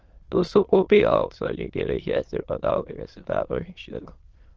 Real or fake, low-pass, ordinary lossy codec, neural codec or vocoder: fake; 7.2 kHz; Opus, 16 kbps; autoencoder, 22.05 kHz, a latent of 192 numbers a frame, VITS, trained on many speakers